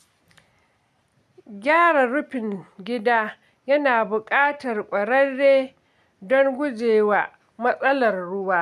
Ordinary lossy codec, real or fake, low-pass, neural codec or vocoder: none; real; 14.4 kHz; none